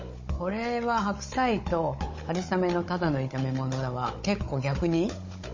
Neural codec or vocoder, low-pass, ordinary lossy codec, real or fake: codec, 16 kHz, 16 kbps, FunCodec, trained on Chinese and English, 50 frames a second; 7.2 kHz; MP3, 32 kbps; fake